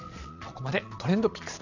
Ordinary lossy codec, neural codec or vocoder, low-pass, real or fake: none; vocoder, 22.05 kHz, 80 mel bands, Vocos; 7.2 kHz; fake